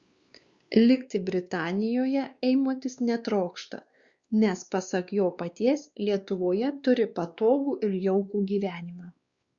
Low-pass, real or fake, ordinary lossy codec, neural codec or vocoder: 7.2 kHz; fake; Opus, 64 kbps; codec, 16 kHz, 2 kbps, X-Codec, WavLM features, trained on Multilingual LibriSpeech